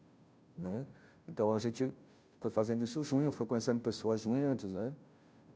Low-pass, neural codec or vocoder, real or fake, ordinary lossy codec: none; codec, 16 kHz, 0.5 kbps, FunCodec, trained on Chinese and English, 25 frames a second; fake; none